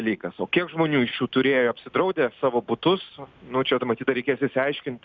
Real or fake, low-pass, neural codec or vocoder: real; 7.2 kHz; none